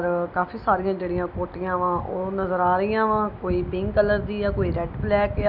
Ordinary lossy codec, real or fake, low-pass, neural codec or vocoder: none; real; 5.4 kHz; none